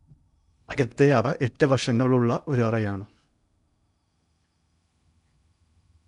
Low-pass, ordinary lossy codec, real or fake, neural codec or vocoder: 10.8 kHz; none; fake; codec, 16 kHz in and 24 kHz out, 0.8 kbps, FocalCodec, streaming, 65536 codes